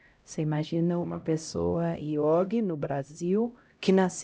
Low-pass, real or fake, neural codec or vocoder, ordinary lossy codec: none; fake; codec, 16 kHz, 0.5 kbps, X-Codec, HuBERT features, trained on LibriSpeech; none